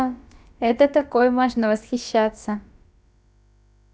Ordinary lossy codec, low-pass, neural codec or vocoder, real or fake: none; none; codec, 16 kHz, about 1 kbps, DyCAST, with the encoder's durations; fake